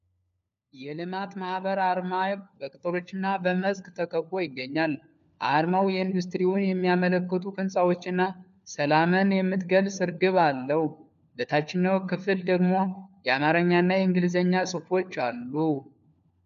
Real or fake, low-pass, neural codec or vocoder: fake; 7.2 kHz; codec, 16 kHz, 4 kbps, FunCodec, trained on LibriTTS, 50 frames a second